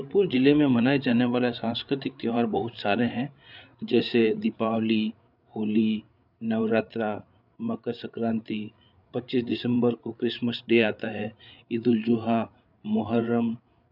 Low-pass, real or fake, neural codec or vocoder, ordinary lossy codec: 5.4 kHz; fake; codec, 16 kHz, 8 kbps, FreqCodec, larger model; none